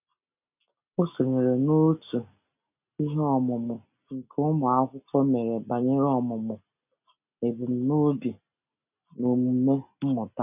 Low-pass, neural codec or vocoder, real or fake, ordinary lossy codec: 3.6 kHz; none; real; none